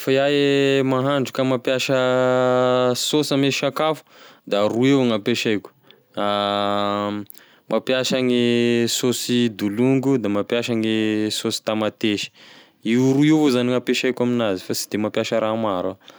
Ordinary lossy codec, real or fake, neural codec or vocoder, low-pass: none; real; none; none